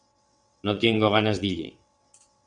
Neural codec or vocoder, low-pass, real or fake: vocoder, 22.05 kHz, 80 mel bands, WaveNeXt; 9.9 kHz; fake